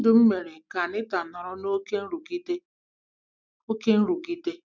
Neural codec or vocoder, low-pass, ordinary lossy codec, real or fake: none; none; none; real